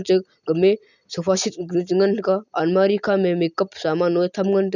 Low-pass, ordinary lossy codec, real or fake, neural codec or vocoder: 7.2 kHz; none; real; none